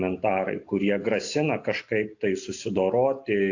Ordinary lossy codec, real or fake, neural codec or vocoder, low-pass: AAC, 48 kbps; real; none; 7.2 kHz